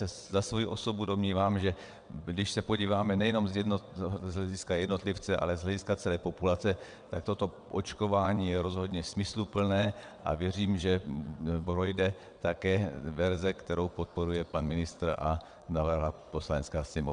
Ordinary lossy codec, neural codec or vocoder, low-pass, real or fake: MP3, 96 kbps; vocoder, 22.05 kHz, 80 mel bands, WaveNeXt; 9.9 kHz; fake